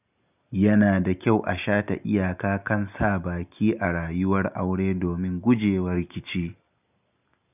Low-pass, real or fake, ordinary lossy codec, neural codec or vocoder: 3.6 kHz; real; none; none